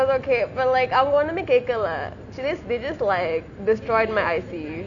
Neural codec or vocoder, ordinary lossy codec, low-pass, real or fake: none; none; 7.2 kHz; real